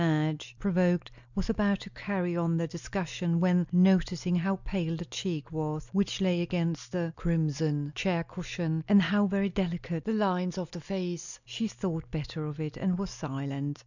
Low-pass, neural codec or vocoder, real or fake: 7.2 kHz; none; real